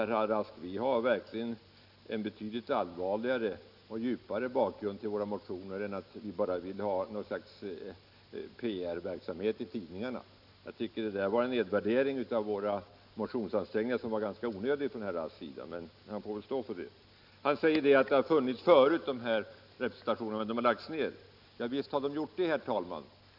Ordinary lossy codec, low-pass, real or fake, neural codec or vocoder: none; 5.4 kHz; real; none